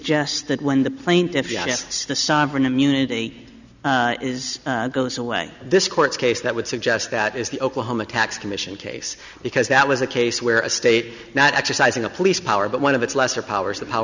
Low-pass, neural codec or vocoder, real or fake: 7.2 kHz; none; real